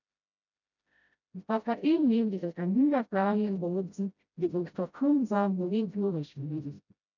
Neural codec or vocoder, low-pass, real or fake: codec, 16 kHz, 0.5 kbps, FreqCodec, smaller model; 7.2 kHz; fake